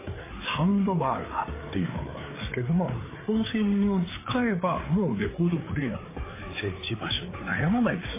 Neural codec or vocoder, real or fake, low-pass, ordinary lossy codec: codec, 16 kHz, 4 kbps, FreqCodec, larger model; fake; 3.6 kHz; MP3, 16 kbps